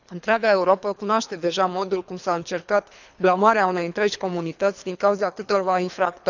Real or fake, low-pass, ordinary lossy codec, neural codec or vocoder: fake; 7.2 kHz; none; codec, 24 kHz, 3 kbps, HILCodec